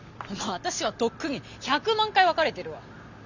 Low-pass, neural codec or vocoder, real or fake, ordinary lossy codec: 7.2 kHz; none; real; none